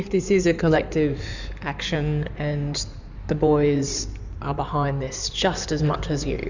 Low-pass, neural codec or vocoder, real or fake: 7.2 kHz; codec, 16 kHz in and 24 kHz out, 2.2 kbps, FireRedTTS-2 codec; fake